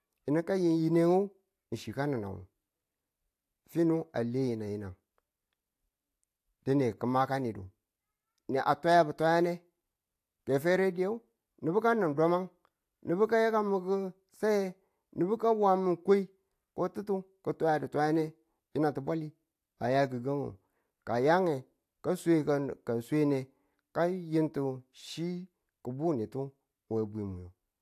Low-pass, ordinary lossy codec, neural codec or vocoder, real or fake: 14.4 kHz; AAC, 64 kbps; none; real